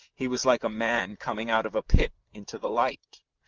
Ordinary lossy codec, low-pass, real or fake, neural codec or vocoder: Opus, 32 kbps; 7.2 kHz; fake; vocoder, 44.1 kHz, 128 mel bands, Pupu-Vocoder